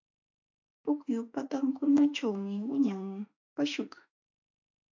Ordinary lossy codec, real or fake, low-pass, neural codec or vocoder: AAC, 48 kbps; fake; 7.2 kHz; autoencoder, 48 kHz, 32 numbers a frame, DAC-VAE, trained on Japanese speech